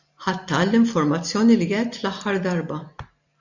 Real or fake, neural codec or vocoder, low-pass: real; none; 7.2 kHz